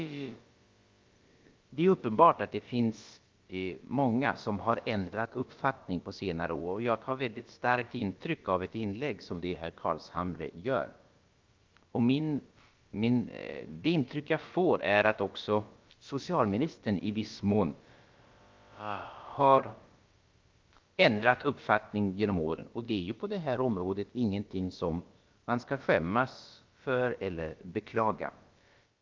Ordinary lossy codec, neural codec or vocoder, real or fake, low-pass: Opus, 32 kbps; codec, 16 kHz, about 1 kbps, DyCAST, with the encoder's durations; fake; 7.2 kHz